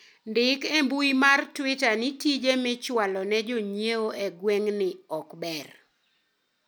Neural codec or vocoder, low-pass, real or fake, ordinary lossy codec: none; none; real; none